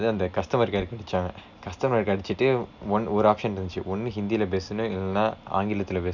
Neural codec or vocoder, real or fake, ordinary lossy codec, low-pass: none; real; none; 7.2 kHz